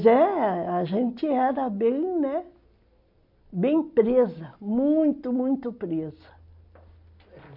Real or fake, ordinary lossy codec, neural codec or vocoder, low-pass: real; MP3, 48 kbps; none; 5.4 kHz